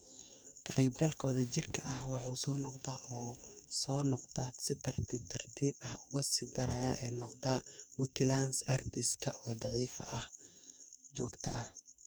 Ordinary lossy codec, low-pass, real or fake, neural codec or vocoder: none; none; fake; codec, 44.1 kHz, 2.6 kbps, DAC